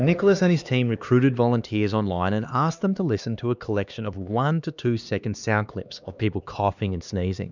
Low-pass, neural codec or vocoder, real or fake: 7.2 kHz; codec, 16 kHz, 2 kbps, X-Codec, HuBERT features, trained on LibriSpeech; fake